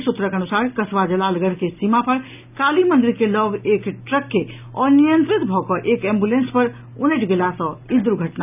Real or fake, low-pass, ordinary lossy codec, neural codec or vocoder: real; 3.6 kHz; none; none